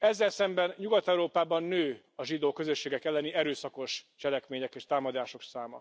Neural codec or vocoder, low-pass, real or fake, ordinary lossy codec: none; none; real; none